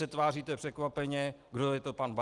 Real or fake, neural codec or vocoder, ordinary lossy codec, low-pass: real; none; Opus, 24 kbps; 10.8 kHz